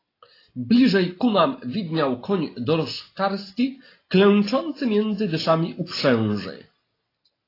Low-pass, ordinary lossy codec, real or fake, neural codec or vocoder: 5.4 kHz; AAC, 24 kbps; real; none